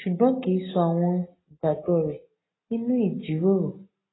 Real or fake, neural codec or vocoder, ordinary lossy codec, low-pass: real; none; AAC, 16 kbps; 7.2 kHz